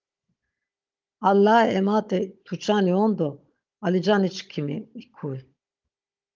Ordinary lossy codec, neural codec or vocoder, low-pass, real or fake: Opus, 24 kbps; codec, 16 kHz, 16 kbps, FunCodec, trained on Chinese and English, 50 frames a second; 7.2 kHz; fake